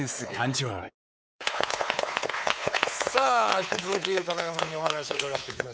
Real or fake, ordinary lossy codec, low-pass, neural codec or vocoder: fake; none; none; codec, 16 kHz, 4 kbps, X-Codec, WavLM features, trained on Multilingual LibriSpeech